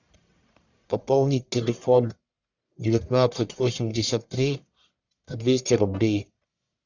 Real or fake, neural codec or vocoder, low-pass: fake; codec, 44.1 kHz, 1.7 kbps, Pupu-Codec; 7.2 kHz